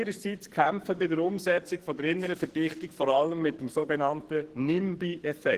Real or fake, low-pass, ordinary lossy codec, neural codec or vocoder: fake; 14.4 kHz; Opus, 16 kbps; codec, 44.1 kHz, 2.6 kbps, SNAC